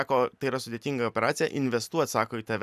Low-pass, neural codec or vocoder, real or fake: 14.4 kHz; none; real